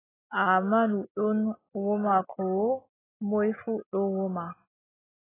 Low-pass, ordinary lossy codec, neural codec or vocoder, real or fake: 3.6 kHz; AAC, 16 kbps; none; real